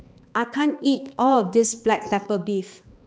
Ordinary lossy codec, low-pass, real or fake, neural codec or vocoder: none; none; fake; codec, 16 kHz, 2 kbps, X-Codec, HuBERT features, trained on balanced general audio